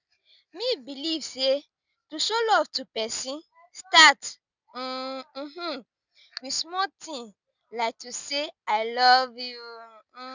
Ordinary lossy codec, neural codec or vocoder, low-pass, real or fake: none; none; 7.2 kHz; real